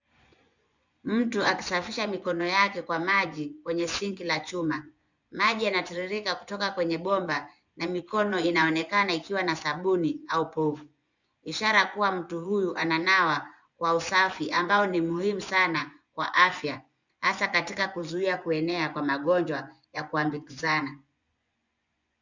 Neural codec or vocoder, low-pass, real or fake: vocoder, 24 kHz, 100 mel bands, Vocos; 7.2 kHz; fake